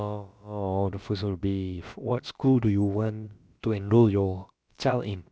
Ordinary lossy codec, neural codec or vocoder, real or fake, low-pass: none; codec, 16 kHz, about 1 kbps, DyCAST, with the encoder's durations; fake; none